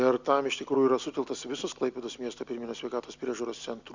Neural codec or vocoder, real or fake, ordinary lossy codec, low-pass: none; real; Opus, 64 kbps; 7.2 kHz